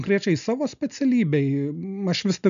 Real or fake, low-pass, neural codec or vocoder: real; 7.2 kHz; none